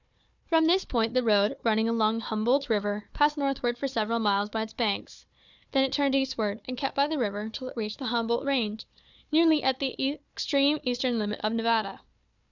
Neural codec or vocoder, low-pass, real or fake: codec, 16 kHz, 4 kbps, FunCodec, trained on Chinese and English, 50 frames a second; 7.2 kHz; fake